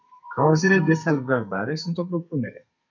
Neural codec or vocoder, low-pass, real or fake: codec, 32 kHz, 1.9 kbps, SNAC; 7.2 kHz; fake